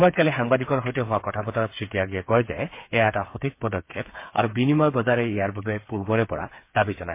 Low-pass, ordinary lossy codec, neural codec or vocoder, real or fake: 3.6 kHz; MP3, 24 kbps; codec, 16 kHz, 8 kbps, FreqCodec, smaller model; fake